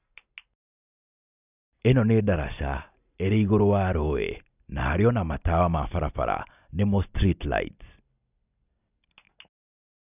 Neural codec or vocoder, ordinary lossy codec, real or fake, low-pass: none; none; real; 3.6 kHz